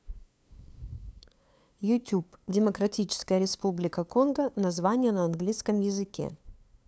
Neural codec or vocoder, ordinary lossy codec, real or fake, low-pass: codec, 16 kHz, 2 kbps, FunCodec, trained on LibriTTS, 25 frames a second; none; fake; none